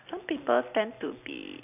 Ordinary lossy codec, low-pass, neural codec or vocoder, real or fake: none; 3.6 kHz; none; real